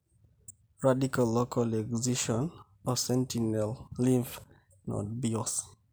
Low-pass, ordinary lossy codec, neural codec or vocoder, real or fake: none; none; none; real